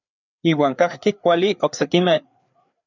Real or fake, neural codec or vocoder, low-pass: fake; codec, 16 kHz, 4 kbps, FreqCodec, larger model; 7.2 kHz